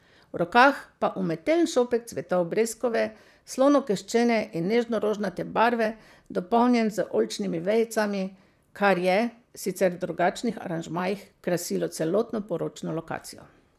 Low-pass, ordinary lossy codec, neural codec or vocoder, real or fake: 14.4 kHz; none; vocoder, 44.1 kHz, 128 mel bands, Pupu-Vocoder; fake